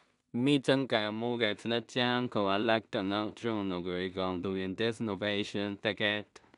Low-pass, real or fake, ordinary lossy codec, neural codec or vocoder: 10.8 kHz; fake; none; codec, 16 kHz in and 24 kHz out, 0.4 kbps, LongCat-Audio-Codec, two codebook decoder